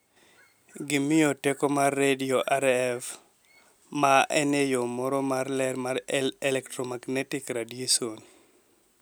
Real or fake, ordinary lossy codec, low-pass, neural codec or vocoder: real; none; none; none